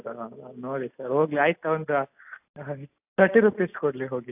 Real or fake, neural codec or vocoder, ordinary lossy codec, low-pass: real; none; AAC, 32 kbps; 3.6 kHz